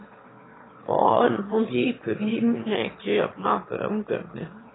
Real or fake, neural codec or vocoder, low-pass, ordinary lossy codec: fake; autoencoder, 22.05 kHz, a latent of 192 numbers a frame, VITS, trained on one speaker; 7.2 kHz; AAC, 16 kbps